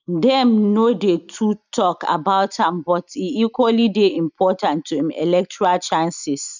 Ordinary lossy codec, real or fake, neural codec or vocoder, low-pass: none; real; none; 7.2 kHz